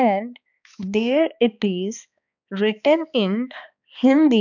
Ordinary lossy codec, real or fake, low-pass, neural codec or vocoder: none; fake; 7.2 kHz; codec, 16 kHz, 2 kbps, X-Codec, HuBERT features, trained on balanced general audio